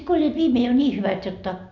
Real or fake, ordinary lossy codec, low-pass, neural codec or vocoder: real; none; 7.2 kHz; none